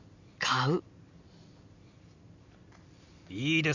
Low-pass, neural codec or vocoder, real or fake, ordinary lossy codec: 7.2 kHz; none; real; none